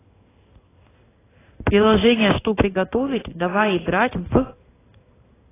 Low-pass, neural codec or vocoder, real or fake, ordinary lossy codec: 3.6 kHz; codec, 16 kHz in and 24 kHz out, 1 kbps, XY-Tokenizer; fake; AAC, 16 kbps